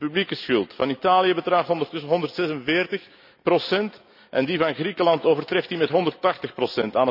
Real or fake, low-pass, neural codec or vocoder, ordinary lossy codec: real; 5.4 kHz; none; none